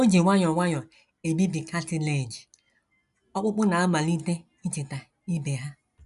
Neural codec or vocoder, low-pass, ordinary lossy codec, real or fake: none; 10.8 kHz; none; real